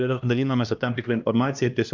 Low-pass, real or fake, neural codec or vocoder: 7.2 kHz; fake; codec, 16 kHz, 1 kbps, X-Codec, HuBERT features, trained on LibriSpeech